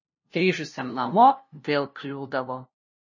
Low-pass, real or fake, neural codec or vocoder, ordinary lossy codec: 7.2 kHz; fake; codec, 16 kHz, 0.5 kbps, FunCodec, trained on LibriTTS, 25 frames a second; MP3, 32 kbps